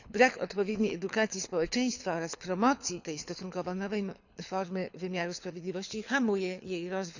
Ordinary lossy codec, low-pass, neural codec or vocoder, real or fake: none; 7.2 kHz; codec, 24 kHz, 6 kbps, HILCodec; fake